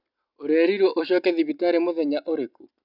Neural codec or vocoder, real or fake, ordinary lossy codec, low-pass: none; real; none; 5.4 kHz